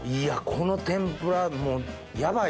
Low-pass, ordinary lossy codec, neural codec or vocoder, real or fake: none; none; none; real